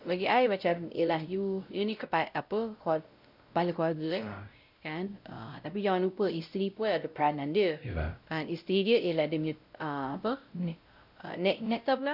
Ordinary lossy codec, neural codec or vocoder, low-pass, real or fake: none; codec, 16 kHz, 0.5 kbps, X-Codec, WavLM features, trained on Multilingual LibriSpeech; 5.4 kHz; fake